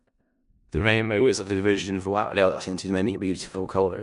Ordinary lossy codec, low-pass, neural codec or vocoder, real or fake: none; 10.8 kHz; codec, 16 kHz in and 24 kHz out, 0.4 kbps, LongCat-Audio-Codec, four codebook decoder; fake